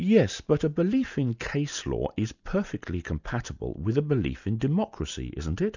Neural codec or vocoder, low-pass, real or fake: none; 7.2 kHz; real